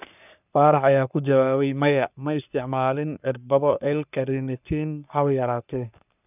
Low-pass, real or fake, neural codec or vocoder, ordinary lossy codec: 3.6 kHz; fake; codec, 44.1 kHz, 3.4 kbps, Pupu-Codec; none